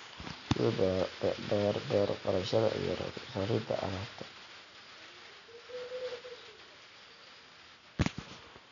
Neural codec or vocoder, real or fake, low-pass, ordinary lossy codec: none; real; 7.2 kHz; none